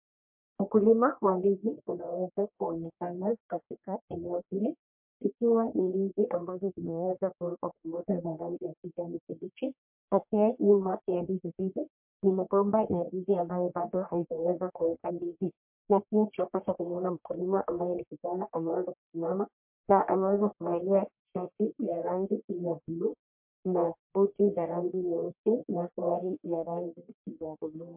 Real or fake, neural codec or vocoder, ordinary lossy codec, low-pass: fake; codec, 44.1 kHz, 1.7 kbps, Pupu-Codec; MP3, 32 kbps; 3.6 kHz